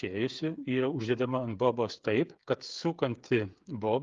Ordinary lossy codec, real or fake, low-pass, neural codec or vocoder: Opus, 32 kbps; fake; 7.2 kHz; codec, 16 kHz, 8 kbps, FreqCodec, smaller model